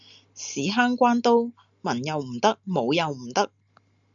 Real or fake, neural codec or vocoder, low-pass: real; none; 7.2 kHz